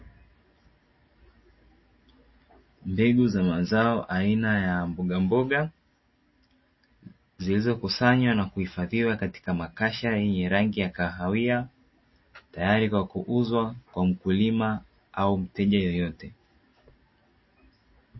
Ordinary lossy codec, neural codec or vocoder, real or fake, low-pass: MP3, 24 kbps; none; real; 7.2 kHz